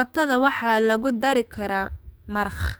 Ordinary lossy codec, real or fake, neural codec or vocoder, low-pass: none; fake; codec, 44.1 kHz, 2.6 kbps, SNAC; none